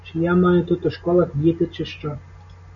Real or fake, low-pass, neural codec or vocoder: real; 7.2 kHz; none